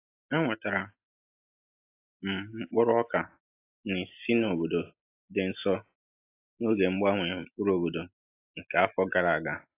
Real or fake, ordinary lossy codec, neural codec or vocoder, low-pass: real; AAC, 24 kbps; none; 3.6 kHz